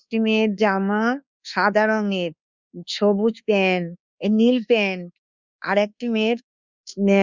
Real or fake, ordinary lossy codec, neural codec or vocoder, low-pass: fake; Opus, 64 kbps; codec, 24 kHz, 1.2 kbps, DualCodec; 7.2 kHz